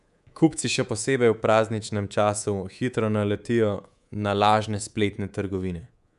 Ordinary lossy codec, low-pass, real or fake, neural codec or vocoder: none; 10.8 kHz; fake; codec, 24 kHz, 3.1 kbps, DualCodec